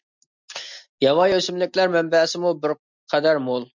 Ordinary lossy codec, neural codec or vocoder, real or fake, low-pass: MP3, 64 kbps; none; real; 7.2 kHz